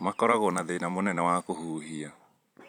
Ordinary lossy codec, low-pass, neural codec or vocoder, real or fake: none; 19.8 kHz; vocoder, 44.1 kHz, 128 mel bands every 256 samples, BigVGAN v2; fake